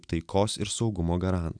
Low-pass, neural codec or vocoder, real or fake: 9.9 kHz; none; real